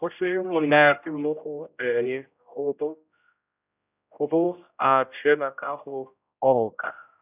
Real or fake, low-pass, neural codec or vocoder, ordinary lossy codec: fake; 3.6 kHz; codec, 16 kHz, 0.5 kbps, X-Codec, HuBERT features, trained on general audio; none